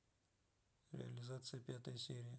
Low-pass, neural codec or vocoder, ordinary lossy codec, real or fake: none; none; none; real